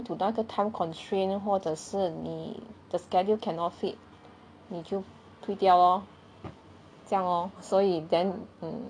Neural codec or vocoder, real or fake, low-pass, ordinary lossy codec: none; real; 9.9 kHz; none